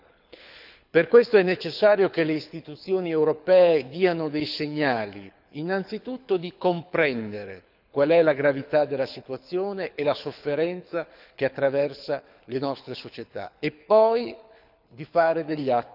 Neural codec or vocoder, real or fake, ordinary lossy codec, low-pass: codec, 24 kHz, 6 kbps, HILCodec; fake; none; 5.4 kHz